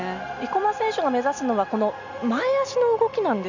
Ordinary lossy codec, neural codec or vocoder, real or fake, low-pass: none; none; real; 7.2 kHz